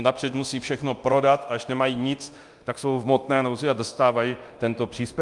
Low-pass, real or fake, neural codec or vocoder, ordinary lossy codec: 10.8 kHz; fake; codec, 24 kHz, 0.9 kbps, DualCodec; Opus, 32 kbps